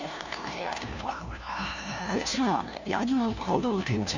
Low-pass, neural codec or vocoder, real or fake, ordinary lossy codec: 7.2 kHz; codec, 16 kHz, 1 kbps, FunCodec, trained on LibriTTS, 50 frames a second; fake; none